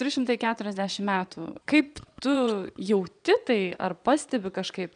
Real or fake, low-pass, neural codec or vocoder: fake; 9.9 kHz; vocoder, 22.05 kHz, 80 mel bands, Vocos